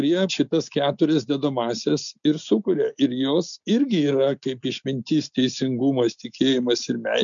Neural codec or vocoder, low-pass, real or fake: none; 7.2 kHz; real